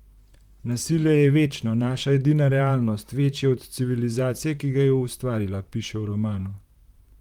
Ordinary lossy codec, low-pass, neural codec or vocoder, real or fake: Opus, 32 kbps; 19.8 kHz; vocoder, 44.1 kHz, 128 mel bands, Pupu-Vocoder; fake